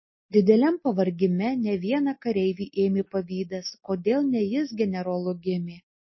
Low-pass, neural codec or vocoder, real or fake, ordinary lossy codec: 7.2 kHz; none; real; MP3, 24 kbps